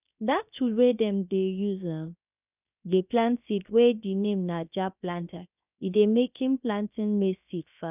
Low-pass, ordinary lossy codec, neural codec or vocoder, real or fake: 3.6 kHz; none; codec, 16 kHz, 0.7 kbps, FocalCodec; fake